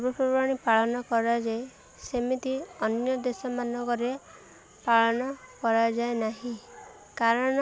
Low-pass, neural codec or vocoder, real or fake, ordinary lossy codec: none; none; real; none